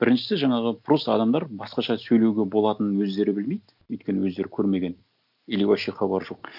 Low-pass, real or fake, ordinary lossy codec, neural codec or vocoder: 5.4 kHz; real; none; none